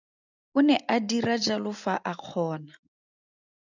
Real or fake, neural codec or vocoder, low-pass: real; none; 7.2 kHz